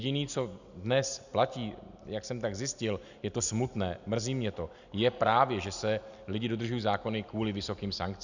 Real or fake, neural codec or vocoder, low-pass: real; none; 7.2 kHz